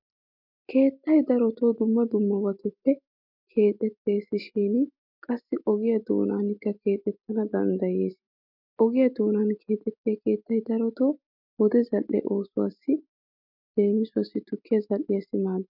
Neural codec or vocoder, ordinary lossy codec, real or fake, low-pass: none; MP3, 48 kbps; real; 5.4 kHz